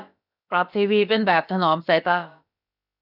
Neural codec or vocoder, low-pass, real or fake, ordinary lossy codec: codec, 16 kHz, about 1 kbps, DyCAST, with the encoder's durations; 5.4 kHz; fake; none